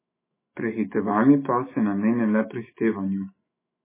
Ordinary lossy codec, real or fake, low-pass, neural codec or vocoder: MP3, 16 kbps; fake; 3.6 kHz; autoencoder, 48 kHz, 128 numbers a frame, DAC-VAE, trained on Japanese speech